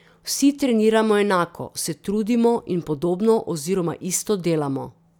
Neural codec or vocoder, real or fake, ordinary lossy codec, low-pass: none; real; none; 19.8 kHz